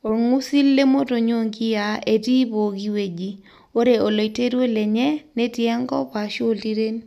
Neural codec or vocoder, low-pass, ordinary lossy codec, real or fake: none; 14.4 kHz; none; real